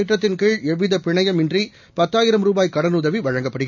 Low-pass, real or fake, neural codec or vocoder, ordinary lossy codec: 7.2 kHz; real; none; none